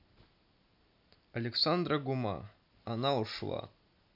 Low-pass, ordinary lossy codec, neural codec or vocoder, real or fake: 5.4 kHz; none; none; real